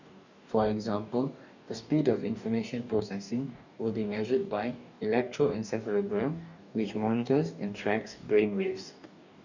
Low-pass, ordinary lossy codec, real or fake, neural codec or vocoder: 7.2 kHz; none; fake; codec, 44.1 kHz, 2.6 kbps, DAC